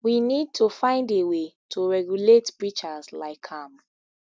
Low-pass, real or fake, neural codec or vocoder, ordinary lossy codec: none; real; none; none